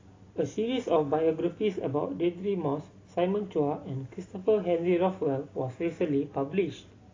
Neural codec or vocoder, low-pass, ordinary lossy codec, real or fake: none; 7.2 kHz; AAC, 32 kbps; real